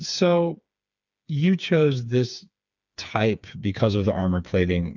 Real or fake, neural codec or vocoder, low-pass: fake; codec, 16 kHz, 4 kbps, FreqCodec, smaller model; 7.2 kHz